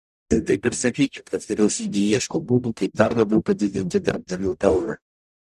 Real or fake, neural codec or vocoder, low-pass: fake; codec, 44.1 kHz, 0.9 kbps, DAC; 14.4 kHz